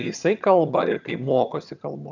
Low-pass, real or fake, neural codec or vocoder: 7.2 kHz; fake; vocoder, 22.05 kHz, 80 mel bands, HiFi-GAN